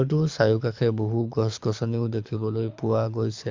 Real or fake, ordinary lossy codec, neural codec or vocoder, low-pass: fake; MP3, 64 kbps; codec, 24 kHz, 6 kbps, HILCodec; 7.2 kHz